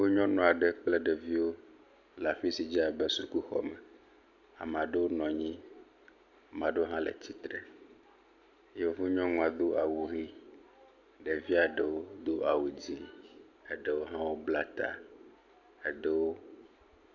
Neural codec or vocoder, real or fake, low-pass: none; real; 7.2 kHz